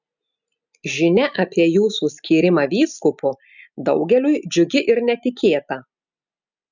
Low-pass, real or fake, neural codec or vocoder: 7.2 kHz; real; none